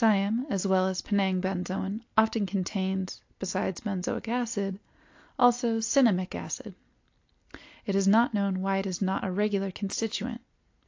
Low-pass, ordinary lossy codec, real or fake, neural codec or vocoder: 7.2 kHz; AAC, 48 kbps; real; none